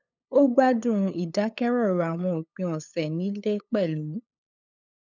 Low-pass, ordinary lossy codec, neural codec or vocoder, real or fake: 7.2 kHz; none; codec, 16 kHz, 8 kbps, FunCodec, trained on LibriTTS, 25 frames a second; fake